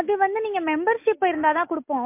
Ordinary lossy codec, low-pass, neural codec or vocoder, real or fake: MP3, 32 kbps; 3.6 kHz; none; real